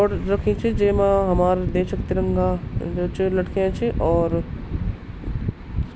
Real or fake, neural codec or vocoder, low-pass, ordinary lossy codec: real; none; none; none